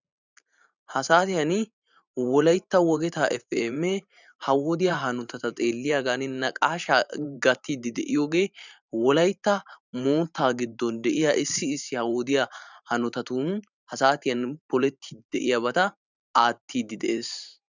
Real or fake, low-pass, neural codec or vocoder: fake; 7.2 kHz; vocoder, 44.1 kHz, 128 mel bands every 512 samples, BigVGAN v2